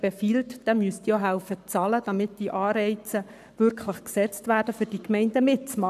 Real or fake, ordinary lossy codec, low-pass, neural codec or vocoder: fake; none; 14.4 kHz; codec, 44.1 kHz, 7.8 kbps, Pupu-Codec